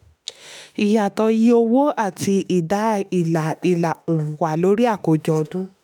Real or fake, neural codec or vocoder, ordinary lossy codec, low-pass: fake; autoencoder, 48 kHz, 32 numbers a frame, DAC-VAE, trained on Japanese speech; none; none